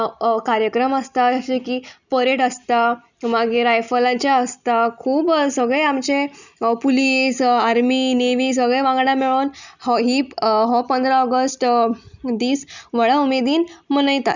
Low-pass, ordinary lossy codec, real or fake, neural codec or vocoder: 7.2 kHz; none; real; none